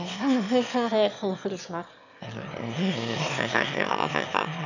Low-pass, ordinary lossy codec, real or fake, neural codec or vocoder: 7.2 kHz; none; fake; autoencoder, 22.05 kHz, a latent of 192 numbers a frame, VITS, trained on one speaker